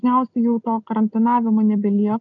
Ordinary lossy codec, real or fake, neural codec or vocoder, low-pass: MP3, 96 kbps; real; none; 7.2 kHz